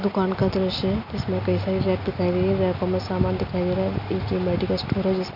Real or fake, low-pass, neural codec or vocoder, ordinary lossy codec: real; 5.4 kHz; none; none